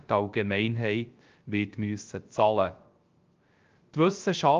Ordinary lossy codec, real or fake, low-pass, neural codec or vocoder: Opus, 32 kbps; fake; 7.2 kHz; codec, 16 kHz, 0.3 kbps, FocalCodec